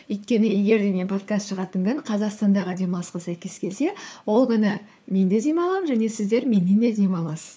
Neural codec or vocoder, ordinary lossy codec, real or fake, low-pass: codec, 16 kHz, 4 kbps, FunCodec, trained on LibriTTS, 50 frames a second; none; fake; none